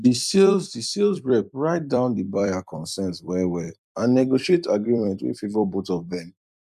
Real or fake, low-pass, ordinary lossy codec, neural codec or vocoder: fake; 14.4 kHz; none; vocoder, 44.1 kHz, 128 mel bands every 256 samples, BigVGAN v2